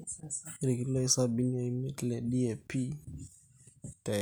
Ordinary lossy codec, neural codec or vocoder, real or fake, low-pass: none; none; real; none